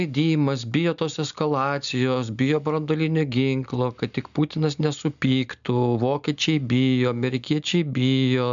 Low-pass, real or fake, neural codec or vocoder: 7.2 kHz; real; none